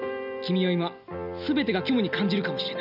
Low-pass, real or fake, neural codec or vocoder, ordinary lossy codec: 5.4 kHz; real; none; none